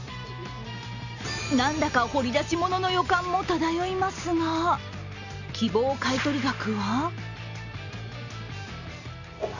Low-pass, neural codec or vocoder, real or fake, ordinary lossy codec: 7.2 kHz; none; real; none